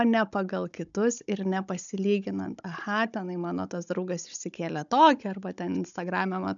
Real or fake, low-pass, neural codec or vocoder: fake; 7.2 kHz; codec, 16 kHz, 16 kbps, FunCodec, trained on Chinese and English, 50 frames a second